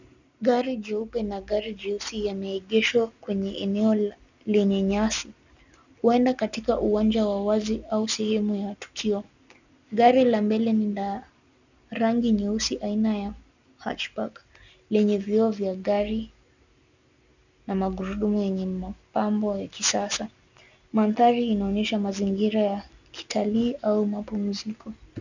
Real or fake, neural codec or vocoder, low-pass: real; none; 7.2 kHz